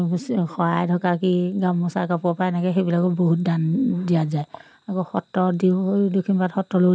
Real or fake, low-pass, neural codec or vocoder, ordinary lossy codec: real; none; none; none